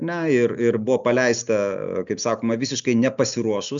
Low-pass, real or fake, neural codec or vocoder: 7.2 kHz; real; none